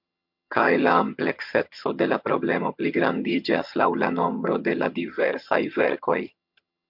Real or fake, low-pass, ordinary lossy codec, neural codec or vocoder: fake; 5.4 kHz; MP3, 48 kbps; vocoder, 22.05 kHz, 80 mel bands, HiFi-GAN